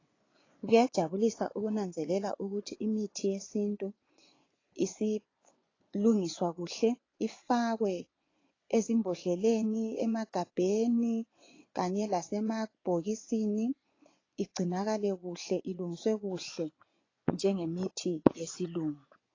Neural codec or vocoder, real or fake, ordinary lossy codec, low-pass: vocoder, 24 kHz, 100 mel bands, Vocos; fake; AAC, 32 kbps; 7.2 kHz